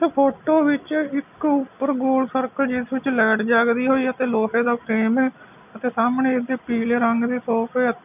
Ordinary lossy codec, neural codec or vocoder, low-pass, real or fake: none; none; 3.6 kHz; real